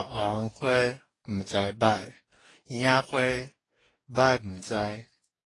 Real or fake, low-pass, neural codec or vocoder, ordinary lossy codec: fake; 10.8 kHz; codec, 44.1 kHz, 2.6 kbps, DAC; AAC, 32 kbps